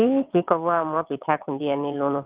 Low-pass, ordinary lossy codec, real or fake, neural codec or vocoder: 3.6 kHz; Opus, 24 kbps; fake; vocoder, 22.05 kHz, 80 mel bands, WaveNeXt